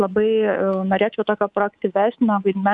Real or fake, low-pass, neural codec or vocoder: fake; 10.8 kHz; codec, 24 kHz, 3.1 kbps, DualCodec